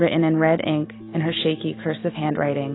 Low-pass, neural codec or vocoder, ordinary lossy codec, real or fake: 7.2 kHz; none; AAC, 16 kbps; real